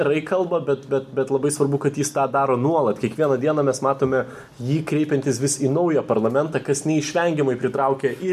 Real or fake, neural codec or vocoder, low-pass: real; none; 14.4 kHz